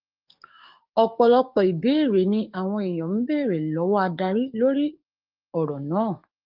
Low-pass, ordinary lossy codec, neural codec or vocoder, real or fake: 5.4 kHz; Opus, 24 kbps; codec, 44.1 kHz, 7.8 kbps, DAC; fake